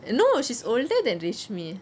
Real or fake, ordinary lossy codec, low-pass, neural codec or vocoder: real; none; none; none